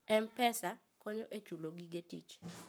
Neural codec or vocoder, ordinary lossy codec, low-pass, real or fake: codec, 44.1 kHz, 7.8 kbps, Pupu-Codec; none; none; fake